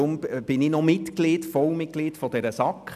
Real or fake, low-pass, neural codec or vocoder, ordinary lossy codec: real; 14.4 kHz; none; none